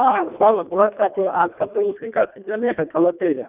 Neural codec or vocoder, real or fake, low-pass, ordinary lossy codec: codec, 24 kHz, 1.5 kbps, HILCodec; fake; 3.6 kHz; none